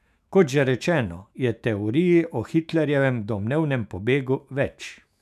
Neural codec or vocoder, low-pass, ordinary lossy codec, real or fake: autoencoder, 48 kHz, 128 numbers a frame, DAC-VAE, trained on Japanese speech; 14.4 kHz; none; fake